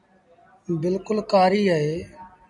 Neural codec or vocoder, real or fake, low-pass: none; real; 10.8 kHz